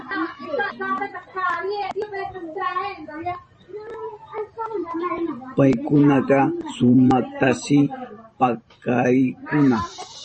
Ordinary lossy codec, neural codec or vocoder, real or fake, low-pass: MP3, 32 kbps; vocoder, 44.1 kHz, 128 mel bands every 512 samples, BigVGAN v2; fake; 10.8 kHz